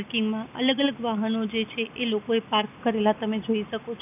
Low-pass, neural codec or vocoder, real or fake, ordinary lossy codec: 3.6 kHz; none; real; none